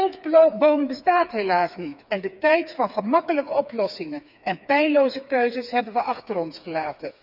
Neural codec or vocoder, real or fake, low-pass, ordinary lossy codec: codec, 16 kHz, 4 kbps, FreqCodec, smaller model; fake; 5.4 kHz; none